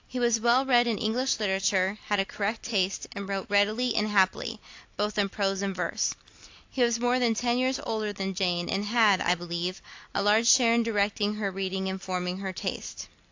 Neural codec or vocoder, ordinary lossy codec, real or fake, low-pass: vocoder, 44.1 kHz, 128 mel bands every 256 samples, BigVGAN v2; AAC, 48 kbps; fake; 7.2 kHz